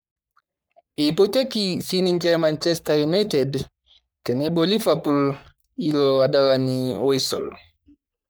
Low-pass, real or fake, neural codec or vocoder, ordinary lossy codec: none; fake; codec, 44.1 kHz, 3.4 kbps, Pupu-Codec; none